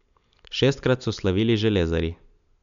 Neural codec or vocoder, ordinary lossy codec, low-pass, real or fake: none; none; 7.2 kHz; real